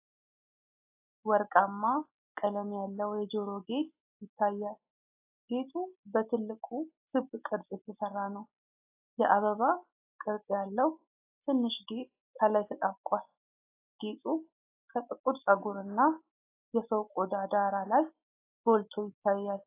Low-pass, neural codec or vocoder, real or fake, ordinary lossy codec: 3.6 kHz; none; real; AAC, 24 kbps